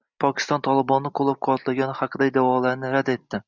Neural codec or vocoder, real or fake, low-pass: none; real; 7.2 kHz